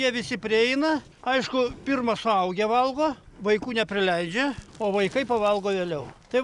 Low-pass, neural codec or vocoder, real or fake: 10.8 kHz; none; real